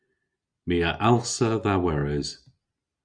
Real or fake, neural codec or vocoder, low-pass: real; none; 9.9 kHz